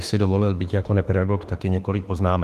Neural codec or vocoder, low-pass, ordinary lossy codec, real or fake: autoencoder, 48 kHz, 32 numbers a frame, DAC-VAE, trained on Japanese speech; 14.4 kHz; Opus, 24 kbps; fake